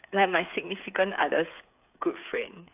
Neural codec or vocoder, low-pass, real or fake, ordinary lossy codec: codec, 16 kHz, 8 kbps, FreqCodec, smaller model; 3.6 kHz; fake; AAC, 32 kbps